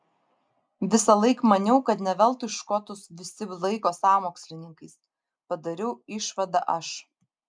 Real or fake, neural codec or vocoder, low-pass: real; none; 9.9 kHz